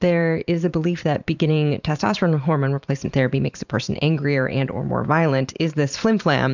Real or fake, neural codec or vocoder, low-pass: fake; vocoder, 44.1 kHz, 128 mel bands every 512 samples, BigVGAN v2; 7.2 kHz